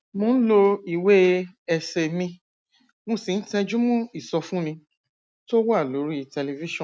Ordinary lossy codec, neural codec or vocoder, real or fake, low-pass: none; none; real; none